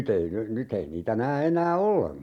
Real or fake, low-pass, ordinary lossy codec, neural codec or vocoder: real; 19.8 kHz; none; none